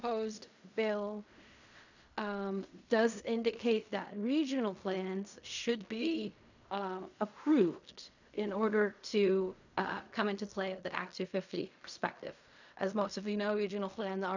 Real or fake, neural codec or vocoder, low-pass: fake; codec, 16 kHz in and 24 kHz out, 0.4 kbps, LongCat-Audio-Codec, fine tuned four codebook decoder; 7.2 kHz